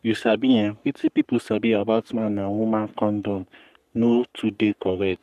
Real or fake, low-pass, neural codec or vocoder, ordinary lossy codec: fake; 14.4 kHz; codec, 44.1 kHz, 3.4 kbps, Pupu-Codec; none